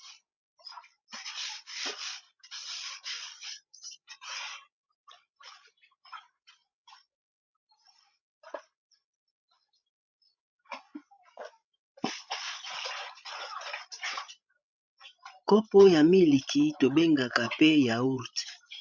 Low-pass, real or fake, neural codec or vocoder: 7.2 kHz; fake; codec, 16 kHz, 16 kbps, FreqCodec, larger model